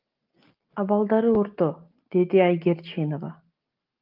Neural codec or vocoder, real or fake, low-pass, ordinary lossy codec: none; real; 5.4 kHz; Opus, 32 kbps